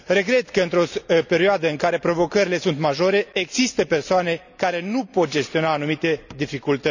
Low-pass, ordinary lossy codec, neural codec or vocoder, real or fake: 7.2 kHz; none; none; real